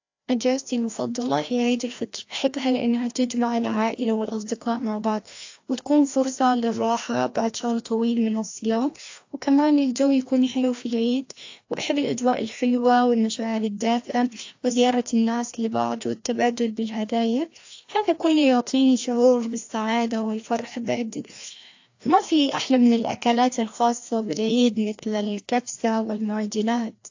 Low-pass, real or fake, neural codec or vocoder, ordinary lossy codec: 7.2 kHz; fake; codec, 16 kHz, 1 kbps, FreqCodec, larger model; AAC, 48 kbps